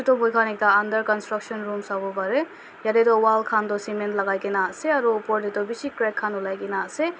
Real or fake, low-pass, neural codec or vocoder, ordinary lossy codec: real; none; none; none